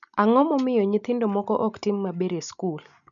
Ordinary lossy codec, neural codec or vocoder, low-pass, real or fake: none; none; 7.2 kHz; real